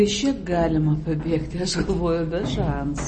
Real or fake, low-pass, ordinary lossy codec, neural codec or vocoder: real; 10.8 kHz; MP3, 32 kbps; none